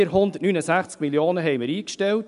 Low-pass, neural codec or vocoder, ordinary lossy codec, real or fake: 10.8 kHz; none; none; real